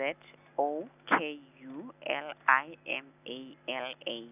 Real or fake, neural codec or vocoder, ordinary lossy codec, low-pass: real; none; none; 3.6 kHz